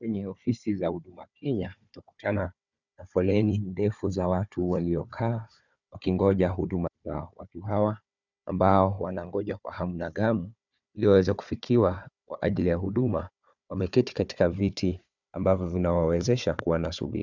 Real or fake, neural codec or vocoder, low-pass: fake; codec, 16 kHz, 4 kbps, FunCodec, trained on Chinese and English, 50 frames a second; 7.2 kHz